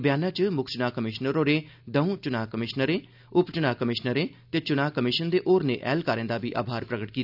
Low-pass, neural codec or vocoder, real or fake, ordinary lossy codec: 5.4 kHz; none; real; none